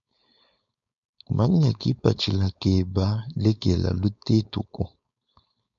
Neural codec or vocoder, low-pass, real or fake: codec, 16 kHz, 4.8 kbps, FACodec; 7.2 kHz; fake